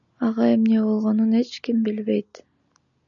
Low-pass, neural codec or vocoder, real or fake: 7.2 kHz; none; real